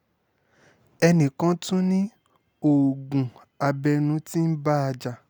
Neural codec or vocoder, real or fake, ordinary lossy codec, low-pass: none; real; none; none